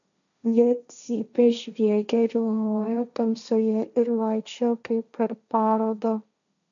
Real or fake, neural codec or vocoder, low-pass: fake; codec, 16 kHz, 1.1 kbps, Voila-Tokenizer; 7.2 kHz